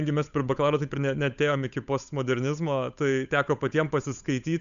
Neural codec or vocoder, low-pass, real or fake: codec, 16 kHz, 8 kbps, FunCodec, trained on Chinese and English, 25 frames a second; 7.2 kHz; fake